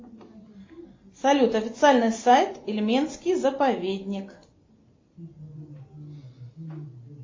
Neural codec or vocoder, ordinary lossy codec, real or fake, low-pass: none; MP3, 32 kbps; real; 7.2 kHz